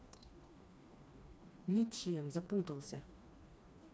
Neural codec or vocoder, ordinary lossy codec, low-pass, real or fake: codec, 16 kHz, 2 kbps, FreqCodec, smaller model; none; none; fake